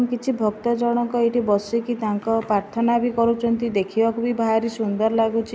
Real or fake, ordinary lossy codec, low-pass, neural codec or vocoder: real; none; none; none